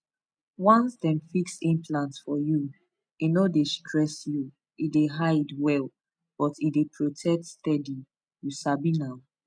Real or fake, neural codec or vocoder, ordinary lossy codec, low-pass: real; none; none; 9.9 kHz